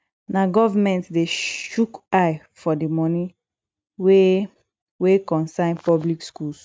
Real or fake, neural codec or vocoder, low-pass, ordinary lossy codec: real; none; none; none